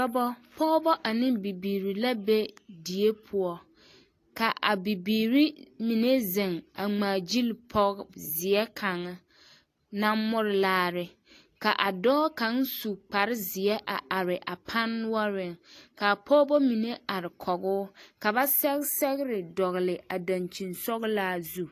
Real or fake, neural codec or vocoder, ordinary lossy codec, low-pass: real; none; AAC, 48 kbps; 14.4 kHz